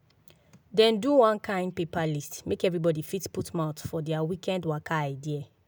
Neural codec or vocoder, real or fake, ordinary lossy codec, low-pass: none; real; none; none